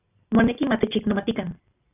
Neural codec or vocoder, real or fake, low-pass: none; real; 3.6 kHz